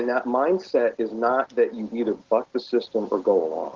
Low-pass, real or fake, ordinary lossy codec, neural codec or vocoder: 7.2 kHz; real; Opus, 16 kbps; none